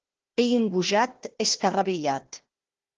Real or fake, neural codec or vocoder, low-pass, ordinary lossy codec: fake; codec, 16 kHz, 1 kbps, FunCodec, trained on Chinese and English, 50 frames a second; 7.2 kHz; Opus, 16 kbps